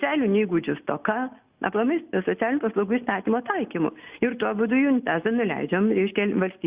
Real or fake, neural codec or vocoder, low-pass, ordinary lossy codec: real; none; 3.6 kHz; Opus, 64 kbps